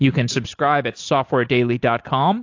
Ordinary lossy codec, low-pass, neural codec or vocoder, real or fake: AAC, 48 kbps; 7.2 kHz; none; real